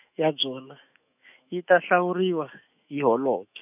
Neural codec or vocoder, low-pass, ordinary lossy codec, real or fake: autoencoder, 48 kHz, 128 numbers a frame, DAC-VAE, trained on Japanese speech; 3.6 kHz; none; fake